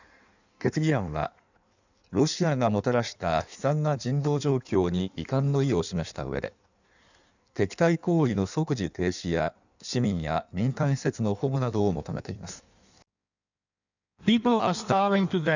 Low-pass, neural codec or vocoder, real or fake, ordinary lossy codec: 7.2 kHz; codec, 16 kHz in and 24 kHz out, 1.1 kbps, FireRedTTS-2 codec; fake; none